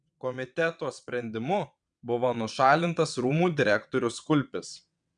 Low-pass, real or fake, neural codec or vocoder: 9.9 kHz; fake; vocoder, 22.05 kHz, 80 mel bands, WaveNeXt